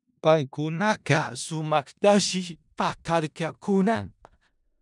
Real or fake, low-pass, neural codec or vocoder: fake; 10.8 kHz; codec, 16 kHz in and 24 kHz out, 0.4 kbps, LongCat-Audio-Codec, four codebook decoder